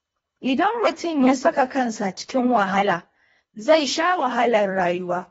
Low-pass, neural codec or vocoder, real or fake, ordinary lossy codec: 10.8 kHz; codec, 24 kHz, 1.5 kbps, HILCodec; fake; AAC, 24 kbps